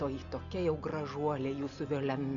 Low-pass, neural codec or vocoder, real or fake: 7.2 kHz; none; real